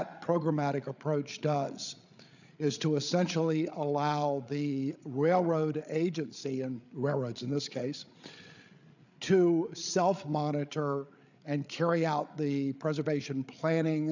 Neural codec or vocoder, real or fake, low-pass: codec, 16 kHz, 8 kbps, FreqCodec, larger model; fake; 7.2 kHz